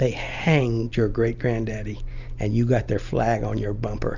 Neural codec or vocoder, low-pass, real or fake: none; 7.2 kHz; real